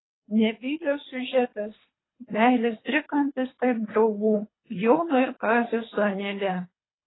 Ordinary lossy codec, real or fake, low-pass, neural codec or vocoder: AAC, 16 kbps; fake; 7.2 kHz; codec, 24 kHz, 3 kbps, HILCodec